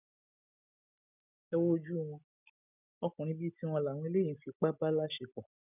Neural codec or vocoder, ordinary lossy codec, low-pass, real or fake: none; none; 3.6 kHz; real